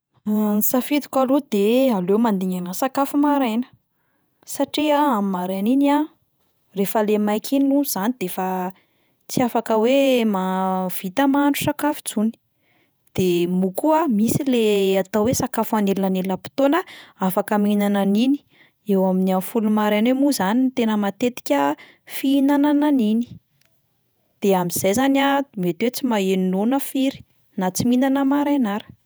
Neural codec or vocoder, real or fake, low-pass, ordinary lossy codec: vocoder, 48 kHz, 128 mel bands, Vocos; fake; none; none